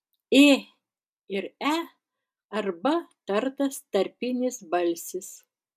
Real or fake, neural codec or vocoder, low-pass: real; none; 14.4 kHz